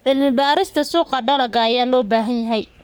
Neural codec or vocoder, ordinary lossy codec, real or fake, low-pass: codec, 44.1 kHz, 3.4 kbps, Pupu-Codec; none; fake; none